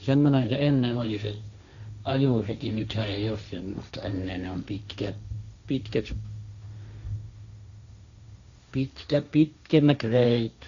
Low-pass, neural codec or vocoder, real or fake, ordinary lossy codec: 7.2 kHz; codec, 16 kHz, 1.1 kbps, Voila-Tokenizer; fake; Opus, 64 kbps